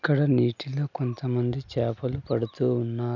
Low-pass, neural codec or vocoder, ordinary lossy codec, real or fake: 7.2 kHz; none; none; real